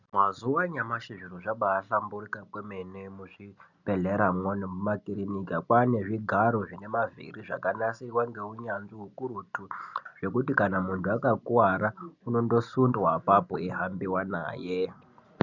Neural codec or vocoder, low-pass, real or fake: none; 7.2 kHz; real